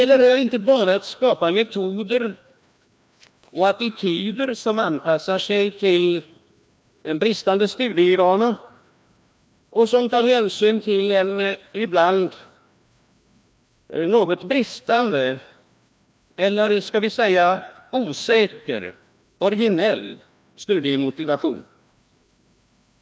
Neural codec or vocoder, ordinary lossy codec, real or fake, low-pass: codec, 16 kHz, 1 kbps, FreqCodec, larger model; none; fake; none